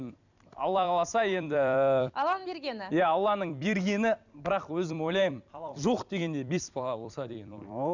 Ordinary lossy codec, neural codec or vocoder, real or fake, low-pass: none; vocoder, 44.1 kHz, 128 mel bands every 256 samples, BigVGAN v2; fake; 7.2 kHz